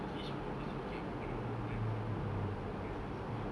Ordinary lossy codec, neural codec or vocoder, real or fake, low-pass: none; none; real; none